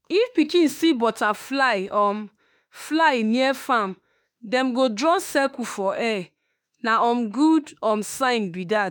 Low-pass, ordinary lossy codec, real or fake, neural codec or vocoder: none; none; fake; autoencoder, 48 kHz, 32 numbers a frame, DAC-VAE, trained on Japanese speech